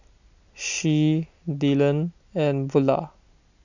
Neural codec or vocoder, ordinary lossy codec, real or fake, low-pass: none; none; real; 7.2 kHz